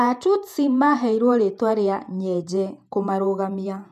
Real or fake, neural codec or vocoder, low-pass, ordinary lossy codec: fake; vocoder, 48 kHz, 128 mel bands, Vocos; 14.4 kHz; none